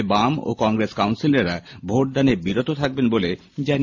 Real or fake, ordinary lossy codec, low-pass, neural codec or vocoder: real; MP3, 48 kbps; 7.2 kHz; none